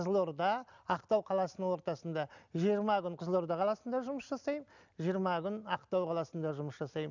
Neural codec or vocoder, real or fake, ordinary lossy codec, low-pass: none; real; none; 7.2 kHz